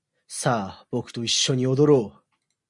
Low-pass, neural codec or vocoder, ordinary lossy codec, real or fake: 10.8 kHz; none; Opus, 64 kbps; real